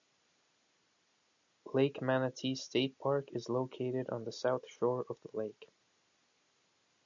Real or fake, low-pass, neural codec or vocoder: real; 7.2 kHz; none